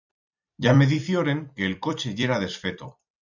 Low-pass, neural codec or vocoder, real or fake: 7.2 kHz; vocoder, 44.1 kHz, 128 mel bands every 256 samples, BigVGAN v2; fake